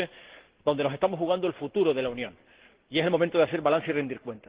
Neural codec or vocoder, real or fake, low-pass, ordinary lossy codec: none; real; 3.6 kHz; Opus, 16 kbps